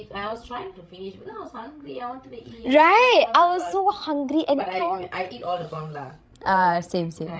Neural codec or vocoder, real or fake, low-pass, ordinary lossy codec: codec, 16 kHz, 16 kbps, FreqCodec, larger model; fake; none; none